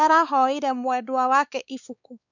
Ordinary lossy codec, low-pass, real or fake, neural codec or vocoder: none; 7.2 kHz; fake; codec, 16 kHz, 4 kbps, X-Codec, WavLM features, trained on Multilingual LibriSpeech